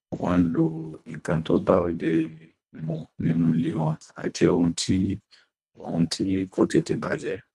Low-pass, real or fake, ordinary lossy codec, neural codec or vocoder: none; fake; none; codec, 24 kHz, 1.5 kbps, HILCodec